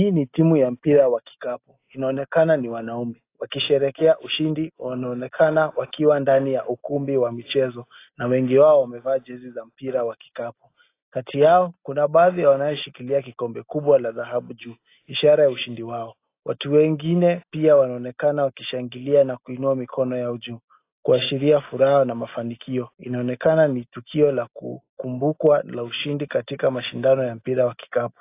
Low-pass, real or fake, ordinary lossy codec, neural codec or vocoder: 3.6 kHz; real; AAC, 24 kbps; none